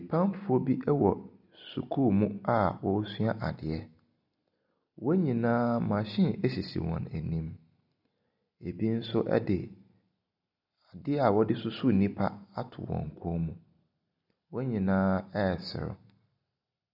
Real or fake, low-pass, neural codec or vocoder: real; 5.4 kHz; none